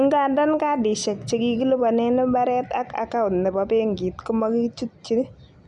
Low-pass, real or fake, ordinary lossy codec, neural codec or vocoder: 10.8 kHz; real; none; none